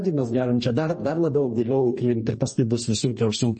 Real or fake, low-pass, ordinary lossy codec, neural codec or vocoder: fake; 10.8 kHz; MP3, 32 kbps; codec, 44.1 kHz, 2.6 kbps, DAC